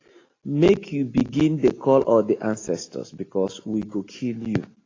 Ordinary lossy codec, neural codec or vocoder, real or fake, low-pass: AAC, 32 kbps; none; real; 7.2 kHz